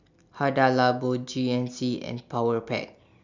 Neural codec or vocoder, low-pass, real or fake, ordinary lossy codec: none; 7.2 kHz; real; none